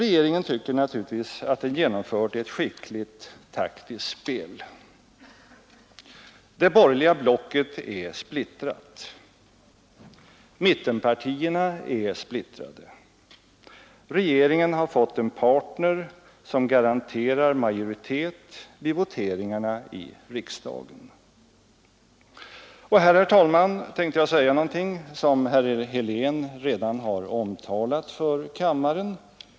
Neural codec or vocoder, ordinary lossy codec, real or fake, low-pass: none; none; real; none